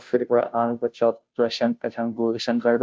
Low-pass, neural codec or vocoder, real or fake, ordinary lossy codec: none; codec, 16 kHz, 0.5 kbps, FunCodec, trained on Chinese and English, 25 frames a second; fake; none